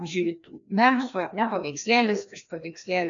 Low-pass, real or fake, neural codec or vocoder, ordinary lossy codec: 7.2 kHz; fake; codec, 16 kHz, 1 kbps, FreqCodec, larger model; AAC, 64 kbps